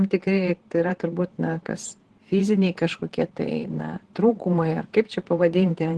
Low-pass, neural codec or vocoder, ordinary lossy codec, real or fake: 10.8 kHz; vocoder, 44.1 kHz, 128 mel bands, Pupu-Vocoder; Opus, 16 kbps; fake